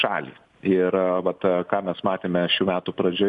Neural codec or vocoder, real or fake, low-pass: none; real; 10.8 kHz